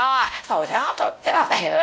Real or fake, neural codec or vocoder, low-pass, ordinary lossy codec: fake; codec, 16 kHz, 0.5 kbps, X-Codec, WavLM features, trained on Multilingual LibriSpeech; none; none